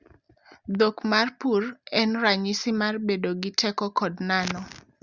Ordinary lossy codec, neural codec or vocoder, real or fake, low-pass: Opus, 64 kbps; none; real; 7.2 kHz